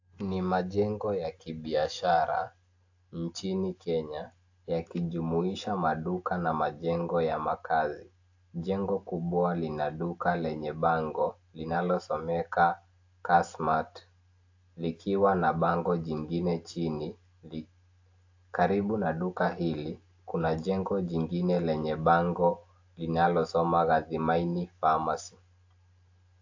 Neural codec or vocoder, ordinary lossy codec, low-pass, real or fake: none; AAC, 48 kbps; 7.2 kHz; real